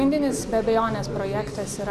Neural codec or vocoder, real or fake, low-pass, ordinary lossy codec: none; real; 14.4 kHz; Opus, 64 kbps